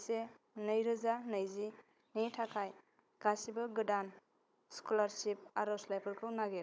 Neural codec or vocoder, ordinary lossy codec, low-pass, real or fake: codec, 16 kHz, 16 kbps, FunCodec, trained on Chinese and English, 50 frames a second; none; none; fake